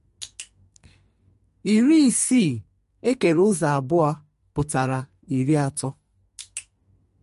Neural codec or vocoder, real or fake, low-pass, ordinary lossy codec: codec, 44.1 kHz, 2.6 kbps, SNAC; fake; 14.4 kHz; MP3, 48 kbps